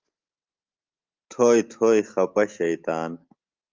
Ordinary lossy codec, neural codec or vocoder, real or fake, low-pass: Opus, 24 kbps; none; real; 7.2 kHz